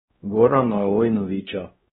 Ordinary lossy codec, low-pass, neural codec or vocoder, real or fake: AAC, 16 kbps; 7.2 kHz; codec, 16 kHz, 1 kbps, X-Codec, WavLM features, trained on Multilingual LibriSpeech; fake